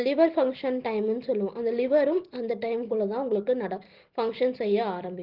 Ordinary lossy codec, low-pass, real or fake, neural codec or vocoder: Opus, 16 kbps; 5.4 kHz; real; none